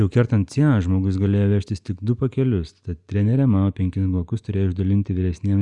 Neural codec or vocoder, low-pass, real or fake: none; 10.8 kHz; real